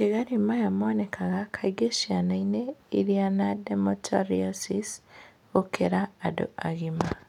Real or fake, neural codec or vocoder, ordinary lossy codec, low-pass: real; none; none; 19.8 kHz